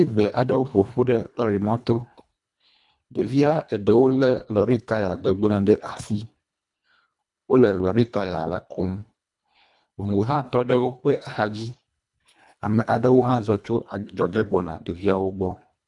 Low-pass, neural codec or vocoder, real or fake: 10.8 kHz; codec, 24 kHz, 1.5 kbps, HILCodec; fake